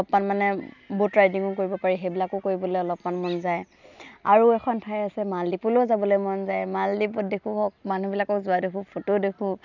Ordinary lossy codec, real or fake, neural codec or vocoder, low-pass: none; real; none; 7.2 kHz